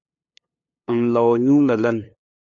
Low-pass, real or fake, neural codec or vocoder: 7.2 kHz; fake; codec, 16 kHz, 2 kbps, FunCodec, trained on LibriTTS, 25 frames a second